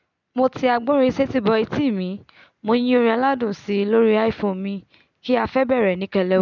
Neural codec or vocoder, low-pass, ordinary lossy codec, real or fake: none; 7.2 kHz; none; real